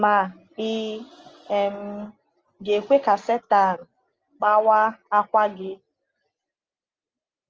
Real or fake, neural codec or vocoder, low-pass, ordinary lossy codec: real; none; 7.2 kHz; Opus, 24 kbps